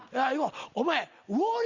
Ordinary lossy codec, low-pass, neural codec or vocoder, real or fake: Opus, 64 kbps; 7.2 kHz; none; real